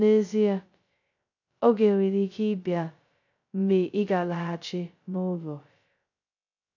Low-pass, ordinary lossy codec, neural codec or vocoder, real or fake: 7.2 kHz; none; codec, 16 kHz, 0.2 kbps, FocalCodec; fake